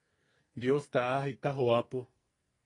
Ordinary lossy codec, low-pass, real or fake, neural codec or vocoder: AAC, 32 kbps; 10.8 kHz; fake; codec, 44.1 kHz, 2.6 kbps, SNAC